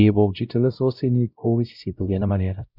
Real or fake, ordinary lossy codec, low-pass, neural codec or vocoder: fake; none; 5.4 kHz; codec, 16 kHz, 0.5 kbps, X-Codec, WavLM features, trained on Multilingual LibriSpeech